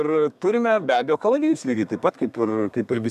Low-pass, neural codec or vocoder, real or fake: 14.4 kHz; codec, 32 kHz, 1.9 kbps, SNAC; fake